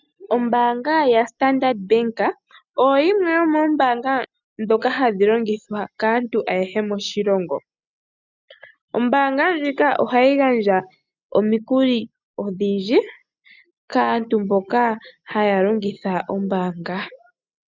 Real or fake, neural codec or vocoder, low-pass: real; none; 7.2 kHz